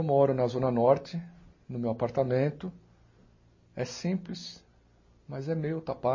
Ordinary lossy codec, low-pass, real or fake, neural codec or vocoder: MP3, 32 kbps; 7.2 kHz; real; none